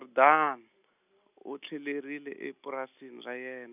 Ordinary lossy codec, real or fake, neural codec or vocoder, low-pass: none; real; none; 3.6 kHz